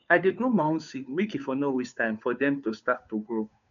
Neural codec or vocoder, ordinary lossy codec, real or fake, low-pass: codec, 16 kHz, 2 kbps, FunCodec, trained on Chinese and English, 25 frames a second; none; fake; 7.2 kHz